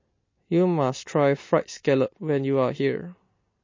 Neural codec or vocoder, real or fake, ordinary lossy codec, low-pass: none; real; MP3, 32 kbps; 7.2 kHz